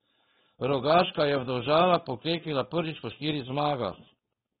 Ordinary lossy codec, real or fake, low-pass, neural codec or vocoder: AAC, 16 kbps; fake; 7.2 kHz; codec, 16 kHz, 4.8 kbps, FACodec